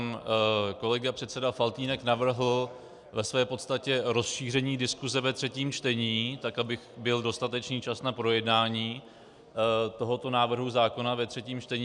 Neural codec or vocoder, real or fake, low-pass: none; real; 10.8 kHz